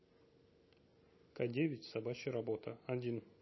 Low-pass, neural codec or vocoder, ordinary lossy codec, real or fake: 7.2 kHz; none; MP3, 24 kbps; real